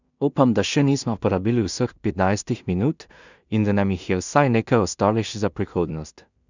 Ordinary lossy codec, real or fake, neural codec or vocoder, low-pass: none; fake; codec, 16 kHz in and 24 kHz out, 0.4 kbps, LongCat-Audio-Codec, two codebook decoder; 7.2 kHz